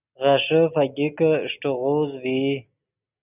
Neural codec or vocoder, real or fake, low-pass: none; real; 3.6 kHz